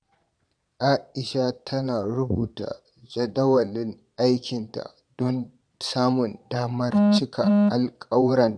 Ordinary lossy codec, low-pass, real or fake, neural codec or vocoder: none; 9.9 kHz; fake; vocoder, 22.05 kHz, 80 mel bands, Vocos